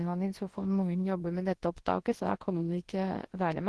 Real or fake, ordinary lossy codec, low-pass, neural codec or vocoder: fake; Opus, 16 kbps; 10.8 kHz; codec, 24 kHz, 0.9 kbps, WavTokenizer, large speech release